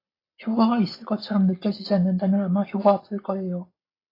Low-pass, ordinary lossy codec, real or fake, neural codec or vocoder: 5.4 kHz; AAC, 24 kbps; real; none